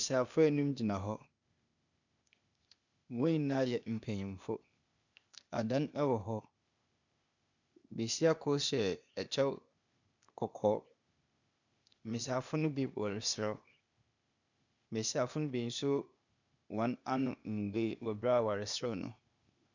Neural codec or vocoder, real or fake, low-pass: codec, 16 kHz, 0.8 kbps, ZipCodec; fake; 7.2 kHz